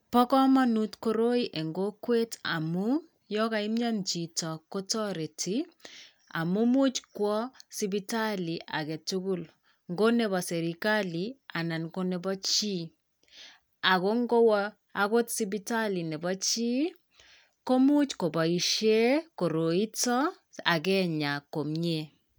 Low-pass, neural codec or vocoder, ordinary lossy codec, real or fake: none; none; none; real